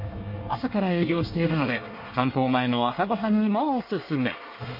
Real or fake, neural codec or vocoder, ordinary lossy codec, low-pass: fake; codec, 24 kHz, 1 kbps, SNAC; MP3, 32 kbps; 5.4 kHz